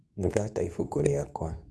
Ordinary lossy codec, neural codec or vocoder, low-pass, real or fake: none; codec, 24 kHz, 0.9 kbps, WavTokenizer, medium speech release version 2; none; fake